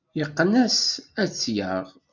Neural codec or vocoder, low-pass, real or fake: none; 7.2 kHz; real